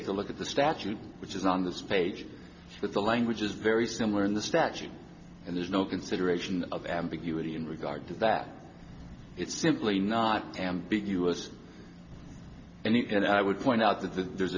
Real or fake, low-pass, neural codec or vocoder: real; 7.2 kHz; none